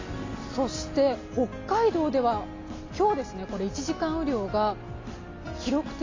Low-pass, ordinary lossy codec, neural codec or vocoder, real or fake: 7.2 kHz; AAC, 32 kbps; none; real